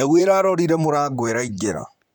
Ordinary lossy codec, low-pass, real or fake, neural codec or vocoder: none; 19.8 kHz; fake; vocoder, 44.1 kHz, 128 mel bands, Pupu-Vocoder